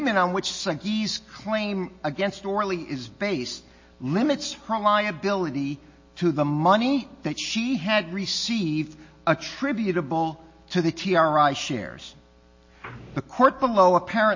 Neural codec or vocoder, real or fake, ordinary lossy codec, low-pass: none; real; MP3, 32 kbps; 7.2 kHz